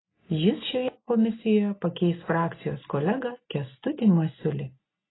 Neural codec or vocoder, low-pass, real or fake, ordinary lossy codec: none; 7.2 kHz; real; AAC, 16 kbps